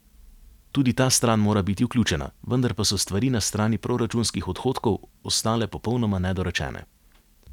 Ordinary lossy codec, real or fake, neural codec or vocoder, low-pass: none; real; none; 19.8 kHz